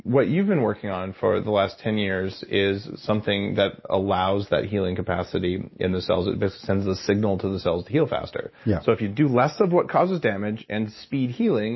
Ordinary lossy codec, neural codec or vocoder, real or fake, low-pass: MP3, 24 kbps; none; real; 7.2 kHz